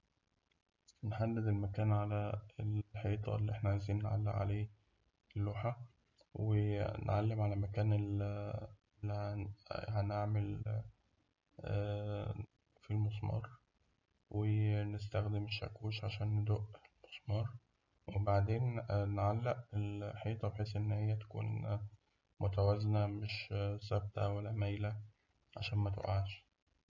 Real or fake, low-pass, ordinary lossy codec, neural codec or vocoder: real; 7.2 kHz; none; none